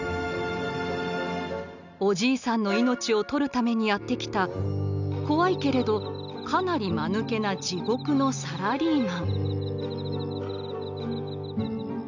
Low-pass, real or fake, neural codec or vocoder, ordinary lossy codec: 7.2 kHz; real; none; none